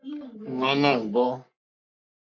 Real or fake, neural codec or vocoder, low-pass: fake; codec, 44.1 kHz, 3.4 kbps, Pupu-Codec; 7.2 kHz